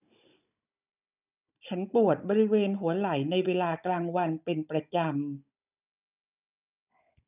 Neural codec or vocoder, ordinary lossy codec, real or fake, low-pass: codec, 16 kHz, 16 kbps, FunCodec, trained on Chinese and English, 50 frames a second; none; fake; 3.6 kHz